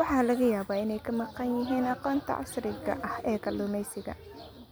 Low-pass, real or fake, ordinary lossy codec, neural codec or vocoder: none; real; none; none